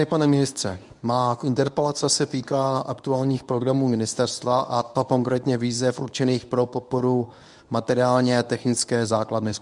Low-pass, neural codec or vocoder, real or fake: 10.8 kHz; codec, 24 kHz, 0.9 kbps, WavTokenizer, medium speech release version 1; fake